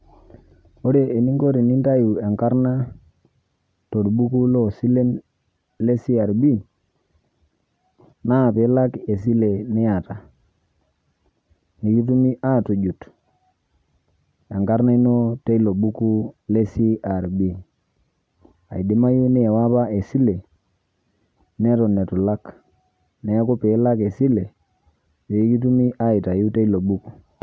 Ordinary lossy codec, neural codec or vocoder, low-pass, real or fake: none; none; none; real